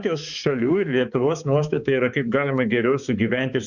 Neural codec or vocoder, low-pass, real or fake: codec, 16 kHz, 4 kbps, X-Codec, HuBERT features, trained on balanced general audio; 7.2 kHz; fake